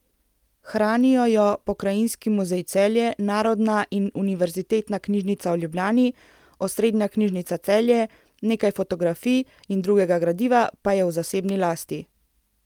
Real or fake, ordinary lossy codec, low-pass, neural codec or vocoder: real; Opus, 32 kbps; 19.8 kHz; none